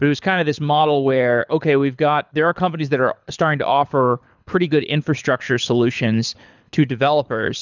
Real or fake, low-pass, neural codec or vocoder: fake; 7.2 kHz; codec, 24 kHz, 6 kbps, HILCodec